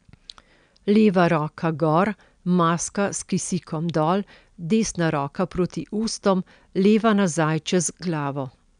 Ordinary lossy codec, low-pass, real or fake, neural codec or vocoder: none; 9.9 kHz; real; none